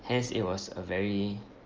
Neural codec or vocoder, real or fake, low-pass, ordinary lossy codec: none; real; 7.2 kHz; Opus, 24 kbps